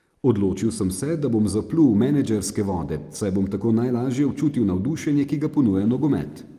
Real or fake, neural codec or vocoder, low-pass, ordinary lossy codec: real; none; 14.4 kHz; Opus, 32 kbps